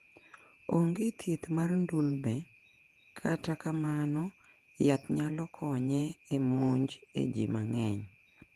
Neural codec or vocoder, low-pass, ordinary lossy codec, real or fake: vocoder, 48 kHz, 128 mel bands, Vocos; 14.4 kHz; Opus, 24 kbps; fake